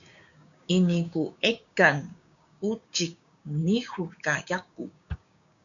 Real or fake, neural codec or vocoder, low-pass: fake; codec, 16 kHz, 6 kbps, DAC; 7.2 kHz